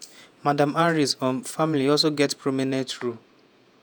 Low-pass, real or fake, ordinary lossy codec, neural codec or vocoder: none; fake; none; vocoder, 48 kHz, 128 mel bands, Vocos